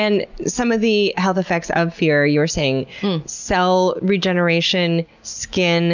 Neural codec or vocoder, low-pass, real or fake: none; 7.2 kHz; real